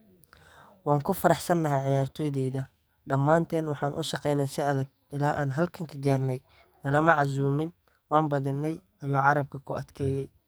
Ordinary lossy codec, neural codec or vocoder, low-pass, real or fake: none; codec, 44.1 kHz, 2.6 kbps, SNAC; none; fake